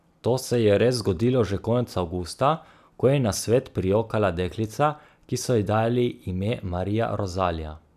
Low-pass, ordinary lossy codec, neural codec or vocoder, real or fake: 14.4 kHz; none; none; real